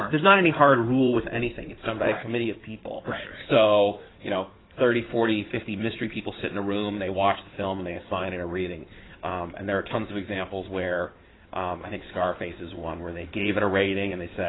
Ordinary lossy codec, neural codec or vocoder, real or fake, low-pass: AAC, 16 kbps; codec, 16 kHz in and 24 kHz out, 2.2 kbps, FireRedTTS-2 codec; fake; 7.2 kHz